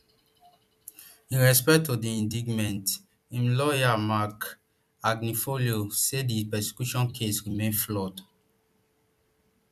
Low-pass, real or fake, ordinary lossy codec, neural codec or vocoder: 14.4 kHz; fake; none; vocoder, 44.1 kHz, 128 mel bands every 256 samples, BigVGAN v2